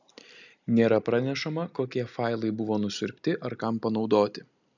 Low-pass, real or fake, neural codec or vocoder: 7.2 kHz; real; none